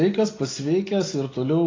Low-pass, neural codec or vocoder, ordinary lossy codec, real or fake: 7.2 kHz; none; AAC, 32 kbps; real